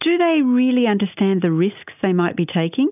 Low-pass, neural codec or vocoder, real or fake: 3.6 kHz; none; real